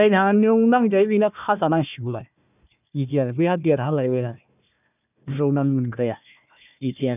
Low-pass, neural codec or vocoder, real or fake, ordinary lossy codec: 3.6 kHz; codec, 16 kHz, 1 kbps, FunCodec, trained on Chinese and English, 50 frames a second; fake; none